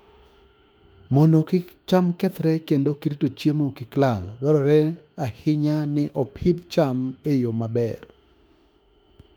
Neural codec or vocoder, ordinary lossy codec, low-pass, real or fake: autoencoder, 48 kHz, 32 numbers a frame, DAC-VAE, trained on Japanese speech; none; 19.8 kHz; fake